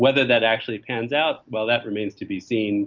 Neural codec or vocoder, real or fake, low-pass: none; real; 7.2 kHz